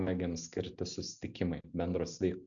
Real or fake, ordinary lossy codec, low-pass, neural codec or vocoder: real; MP3, 96 kbps; 7.2 kHz; none